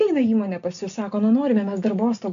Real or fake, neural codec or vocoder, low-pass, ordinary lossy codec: real; none; 7.2 kHz; AAC, 64 kbps